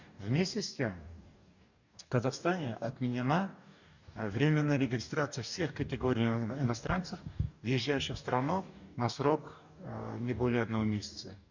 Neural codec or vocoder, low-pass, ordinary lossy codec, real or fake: codec, 44.1 kHz, 2.6 kbps, DAC; 7.2 kHz; none; fake